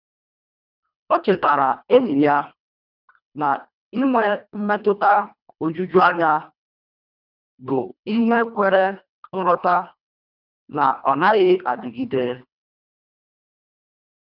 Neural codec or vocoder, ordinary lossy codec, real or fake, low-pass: codec, 24 kHz, 1.5 kbps, HILCodec; none; fake; 5.4 kHz